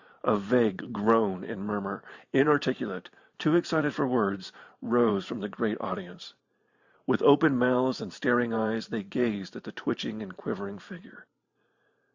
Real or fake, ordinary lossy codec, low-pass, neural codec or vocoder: fake; Opus, 64 kbps; 7.2 kHz; vocoder, 44.1 kHz, 128 mel bands every 512 samples, BigVGAN v2